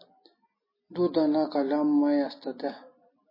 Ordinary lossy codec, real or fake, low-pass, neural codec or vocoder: MP3, 24 kbps; real; 5.4 kHz; none